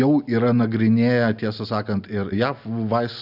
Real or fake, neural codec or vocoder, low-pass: real; none; 5.4 kHz